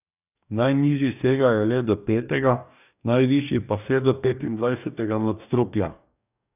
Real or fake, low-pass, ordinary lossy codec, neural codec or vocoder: fake; 3.6 kHz; none; codec, 44.1 kHz, 2.6 kbps, DAC